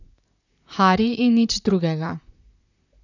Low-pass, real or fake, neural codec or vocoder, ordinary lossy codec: 7.2 kHz; fake; vocoder, 44.1 kHz, 128 mel bands, Pupu-Vocoder; none